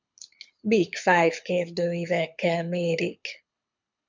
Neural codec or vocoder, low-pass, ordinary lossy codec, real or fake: codec, 24 kHz, 6 kbps, HILCodec; 7.2 kHz; MP3, 64 kbps; fake